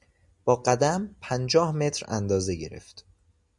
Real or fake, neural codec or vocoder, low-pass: real; none; 10.8 kHz